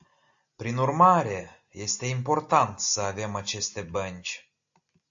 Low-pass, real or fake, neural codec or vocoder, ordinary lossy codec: 7.2 kHz; real; none; AAC, 64 kbps